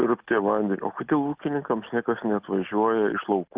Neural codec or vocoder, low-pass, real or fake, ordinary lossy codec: none; 3.6 kHz; real; Opus, 32 kbps